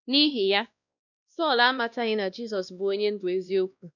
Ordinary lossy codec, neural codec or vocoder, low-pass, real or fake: none; codec, 16 kHz, 1 kbps, X-Codec, WavLM features, trained on Multilingual LibriSpeech; 7.2 kHz; fake